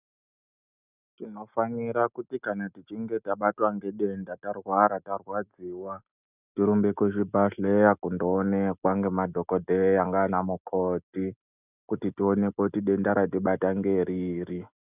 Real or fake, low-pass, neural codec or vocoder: real; 3.6 kHz; none